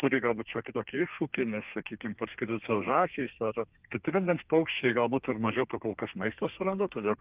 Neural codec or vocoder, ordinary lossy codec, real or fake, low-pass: codec, 32 kHz, 1.9 kbps, SNAC; Opus, 24 kbps; fake; 3.6 kHz